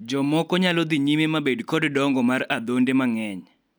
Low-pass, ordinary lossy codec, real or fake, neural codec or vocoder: none; none; real; none